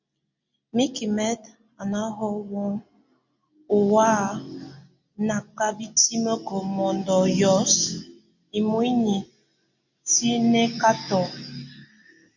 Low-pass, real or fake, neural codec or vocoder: 7.2 kHz; real; none